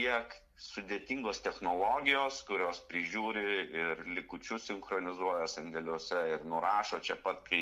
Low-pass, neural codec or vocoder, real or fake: 14.4 kHz; none; real